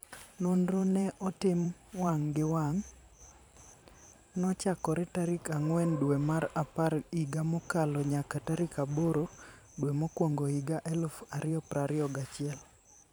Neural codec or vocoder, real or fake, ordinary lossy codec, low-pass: vocoder, 44.1 kHz, 128 mel bands every 512 samples, BigVGAN v2; fake; none; none